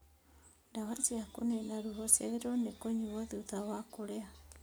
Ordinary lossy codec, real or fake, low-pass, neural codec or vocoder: none; real; none; none